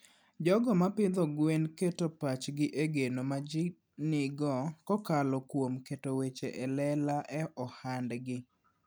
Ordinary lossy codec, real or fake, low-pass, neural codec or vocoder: none; real; none; none